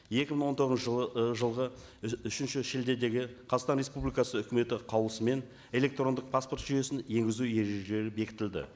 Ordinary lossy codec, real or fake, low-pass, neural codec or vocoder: none; real; none; none